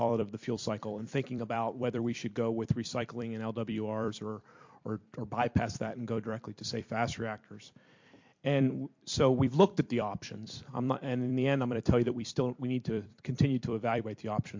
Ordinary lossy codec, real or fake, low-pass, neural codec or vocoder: MP3, 48 kbps; fake; 7.2 kHz; vocoder, 44.1 kHz, 128 mel bands every 256 samples, BigVGAN v2